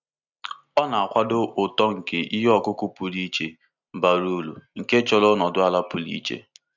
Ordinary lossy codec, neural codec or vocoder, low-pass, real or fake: none; none; 7.2 kHz; real